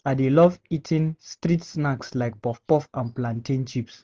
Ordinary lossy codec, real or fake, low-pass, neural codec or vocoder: Opus, 32 kbps; real; 7.2 kHz; none